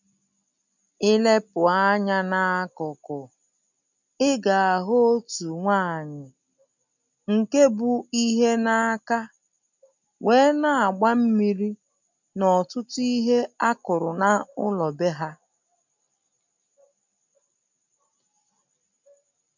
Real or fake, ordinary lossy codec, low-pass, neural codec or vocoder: real; none; 7.2 kHz; none